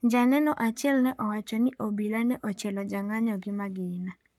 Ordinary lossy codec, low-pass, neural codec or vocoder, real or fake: none; 19.8 kHz; codec, 44.1 kHz, 7.8 kbps, Pupu-Codec; fake